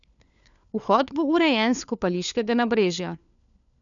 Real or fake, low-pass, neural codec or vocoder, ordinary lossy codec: fake; 7.2 kHz; codec, 16 kHz, 4 kbps, FunCodec, trained on LibriTTS, 50 frames a second; none